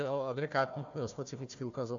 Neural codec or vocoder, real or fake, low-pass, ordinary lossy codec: codec, 16 kHz, 1 kbps, FunCodec, trained on Chinese and English, 50 frames a second; fake; 7.2 kHz; AAC, 64 kbps